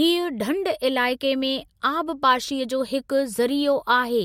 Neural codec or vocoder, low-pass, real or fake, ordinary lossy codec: none; 19.8 kHz; real; MP3, 64 kbps